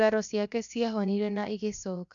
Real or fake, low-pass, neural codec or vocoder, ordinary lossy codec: fake; 7.2 kHz; codec, 16 kHz, 0.7 kbps, FocalCodec; none